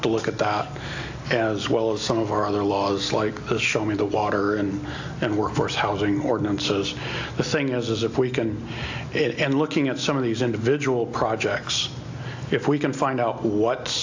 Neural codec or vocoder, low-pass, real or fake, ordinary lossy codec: none; 7.2 kHz; real; AAC, 48 kbps